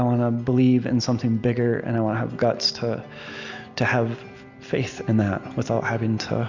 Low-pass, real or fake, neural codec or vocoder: 7.2 kHz; real; none